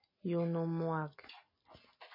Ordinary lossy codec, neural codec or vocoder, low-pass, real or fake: MP3, 24 kbps; none; 5.4 kHz; real